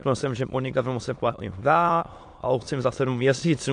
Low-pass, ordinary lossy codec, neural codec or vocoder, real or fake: 9.9 kHz; AAC, 64 kbps; autoencoder, 22.05 kHz, a latent of 192 numbers a frame, VITS, trained on many speakers; fake